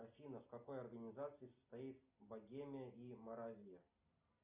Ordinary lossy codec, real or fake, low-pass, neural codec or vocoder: Opus, 64 kbps; real; 3.6 kHz; none